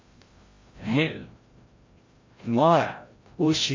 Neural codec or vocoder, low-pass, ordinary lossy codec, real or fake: codec, 16 kHz, 0.5 kbps, FreqCodec, larger model; 7.2 kHz; MP3, 32 kbps; fake